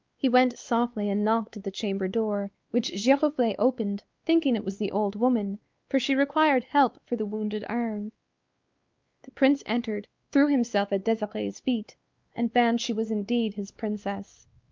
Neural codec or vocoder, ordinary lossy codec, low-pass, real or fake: codec, 16 kHz, 2 kbps, X-Codec, WavLM features, trained on Multilingual LibriSpeech; Opus, 24 kbps; 7.2 kHz; fake